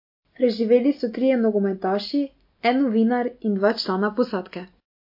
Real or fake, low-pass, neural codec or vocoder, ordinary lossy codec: real; 5.4 kHz; none; MP3, 32 kbps